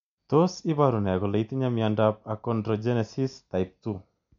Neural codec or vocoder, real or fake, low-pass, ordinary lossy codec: none; real; 7.2 kHz; MP3, 64 kbps